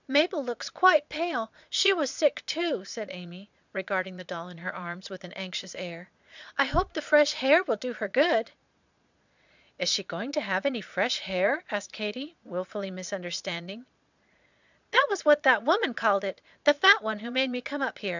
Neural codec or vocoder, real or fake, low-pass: vocoder, 22.05 kHz, 80 mel bands, Vocos; fake; 7.2 kHz